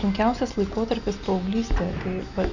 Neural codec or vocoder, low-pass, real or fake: none; 7.2 kHz; real